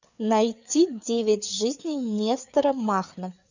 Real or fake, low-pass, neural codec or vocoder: fake; 7.2 kHz; codec, 16 kHz, 4 kbps, FreqCodec, larger model